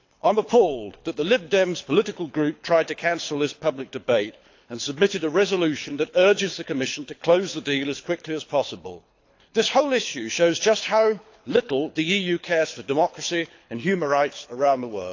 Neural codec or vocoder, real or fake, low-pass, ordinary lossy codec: codec, 24 kHz, 6 kbps, HILCodec; fake; 7.2 kHz; AAC, 48 kbps